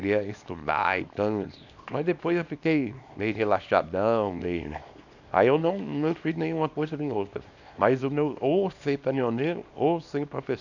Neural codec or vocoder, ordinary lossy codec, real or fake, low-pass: codec, 24 kHz, 0.9 kbps, WavTokenizer, small release; none; fake; 7.2 kHz